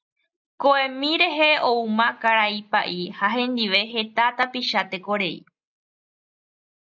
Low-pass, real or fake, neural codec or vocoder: 7.2 kHz; real; none